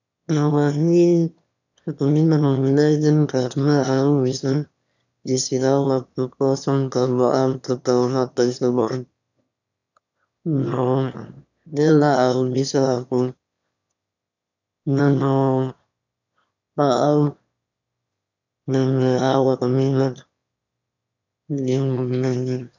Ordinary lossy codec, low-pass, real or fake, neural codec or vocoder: none; 7.2 kHz; fake; autoencoder, 22.05 kHz, a latent of 192 numbers a frame, VITS, trained on one speaker